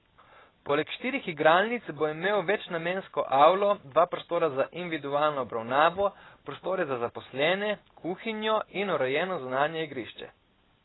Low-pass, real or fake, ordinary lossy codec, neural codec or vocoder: 7.2 kHz; real; AAC, 16 kbps; none